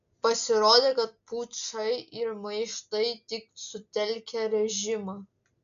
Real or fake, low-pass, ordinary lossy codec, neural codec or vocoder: real; 7.2 kHz; MP3, 96 kbps; none